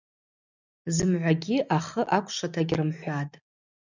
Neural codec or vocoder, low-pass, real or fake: none; 7.2 kHz; real